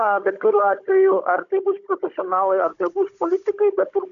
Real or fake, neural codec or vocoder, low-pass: fake; codec, 16 kHz, 16 kbps, FunCodec, trained on Chinese and English, 50 frames a second; 7.2 kHz